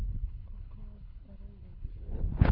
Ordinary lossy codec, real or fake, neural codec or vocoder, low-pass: Opus, 64 kbps; fake; codec, 24 kHz, 6 kbps, HILCodec; 5.4 kHz